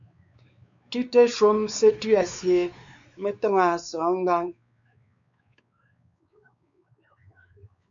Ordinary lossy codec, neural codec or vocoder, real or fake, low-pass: MP3, 64 kbps; codec, 16 kHz, 4 kbps, X-Codec, WavLM features, trained on Multilingual LibriSpeech; fake; 7.2 kHz